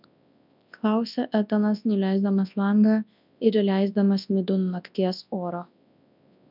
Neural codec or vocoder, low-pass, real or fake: codec, 24 kHz, 0.9 kbps, WavTokenizer, large speech release; 5.4 kHz; fake